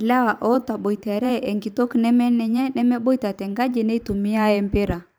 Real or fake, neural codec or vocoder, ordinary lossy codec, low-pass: fake; vocoder, 44.1 kHz, 128 mel bands every 512 samples, BigVGAN v2; none; none